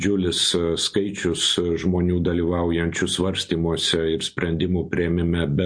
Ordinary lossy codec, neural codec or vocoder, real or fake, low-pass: MP3, 48 kbps; none; real; 9.9 kHz